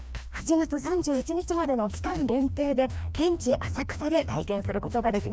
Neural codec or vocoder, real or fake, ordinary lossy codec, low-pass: codec, 16 kHz, 1 kbps, FreqCodec, larger model; fake; none; none